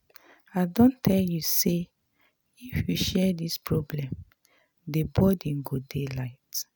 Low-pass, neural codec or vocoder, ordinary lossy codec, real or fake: none; none; none; real